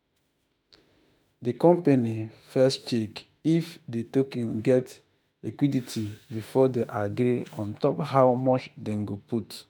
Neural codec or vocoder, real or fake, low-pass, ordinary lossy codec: autoencoder, 48 kHz, 32 numbers a frame, DAC-VAE, trained on Japanese speech; fake; none; none